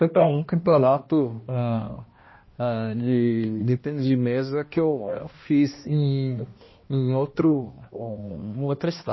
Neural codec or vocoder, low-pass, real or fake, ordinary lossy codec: codec, 16 kHz, 1 kbps, X-Codec, HuBERT features, trained on balanced general audio; 7.2 kHz; fake; MP3, 24 kbps